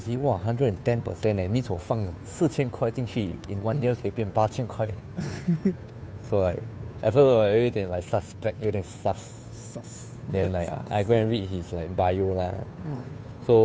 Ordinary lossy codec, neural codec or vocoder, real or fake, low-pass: none; codec, 16 kHz, 2 kbps, FunCodec, trained on Chinese and English, 25 frames a second; fake; none